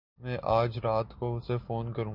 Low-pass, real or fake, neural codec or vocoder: 5.4 kHz; real; none